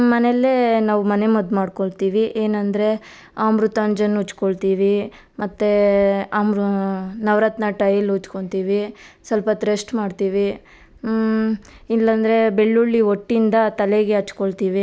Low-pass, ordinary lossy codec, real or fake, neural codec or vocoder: none; none; real; none